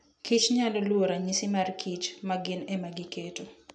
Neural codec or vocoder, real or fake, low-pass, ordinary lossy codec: none; real; 9.9 kHz; none